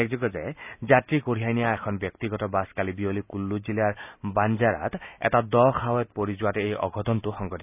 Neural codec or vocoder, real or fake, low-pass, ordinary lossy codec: none; real; 3.6 kHz; none